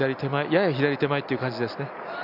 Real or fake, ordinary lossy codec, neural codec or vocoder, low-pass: real; none; none; 5.4 kHz